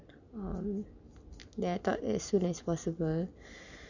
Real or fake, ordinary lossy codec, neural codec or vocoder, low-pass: real; none; none; 7.2 kHz